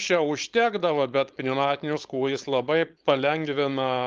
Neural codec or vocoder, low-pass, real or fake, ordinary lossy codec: codec, 16 kHz, 4.8 kbps, FACodec; 7.2 kHz; fake; Opus, 32 kbps